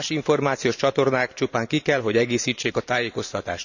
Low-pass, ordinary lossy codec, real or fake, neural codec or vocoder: 7.2 kHz; none; fake; vocoder, 44.1 kHz, 128 mel bands every 512 samples, BigVGAN v2